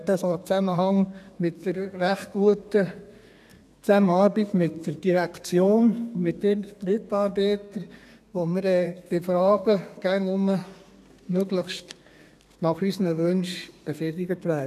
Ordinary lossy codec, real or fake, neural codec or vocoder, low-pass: none; fake; codec, 32 kHz, 1.9 kbps, SNAC; 14.4 kHz